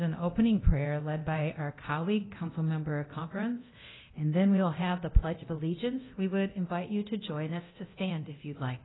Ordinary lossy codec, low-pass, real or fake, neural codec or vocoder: AAC, 16 kbps; 7.2 kHz; fake; codec, 24 kHz, 0.9 kbps, DualCodec